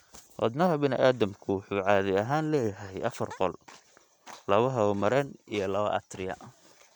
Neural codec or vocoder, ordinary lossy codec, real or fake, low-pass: none; none; real; 19.8 kHz